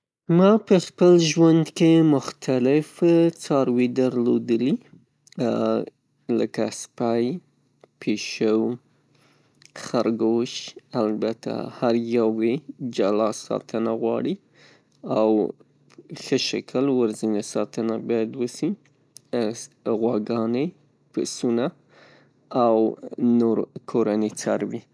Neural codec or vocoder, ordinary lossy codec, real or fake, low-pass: none; none; real; none